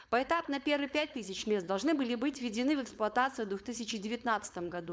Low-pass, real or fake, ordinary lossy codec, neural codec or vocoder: none; fake; none; codec, 16 kHz, 4.8 kbps, FACodec